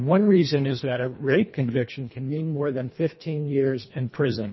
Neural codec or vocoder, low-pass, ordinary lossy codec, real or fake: codec, 24 kHz, 1.5 kbps, HILCodec; 7.2 kHz; MP3, 24 kbps; fake